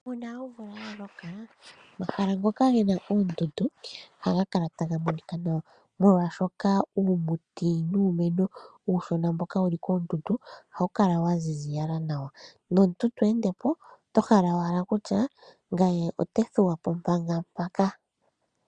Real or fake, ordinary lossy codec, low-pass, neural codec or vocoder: real; Opus, 64 kbps; 9.9 kHz; none